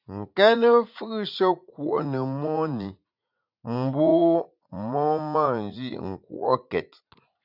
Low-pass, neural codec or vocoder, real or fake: 5.4 kHz; vocoder, 44.1 kHz, 80 mel bands, Vocos; fake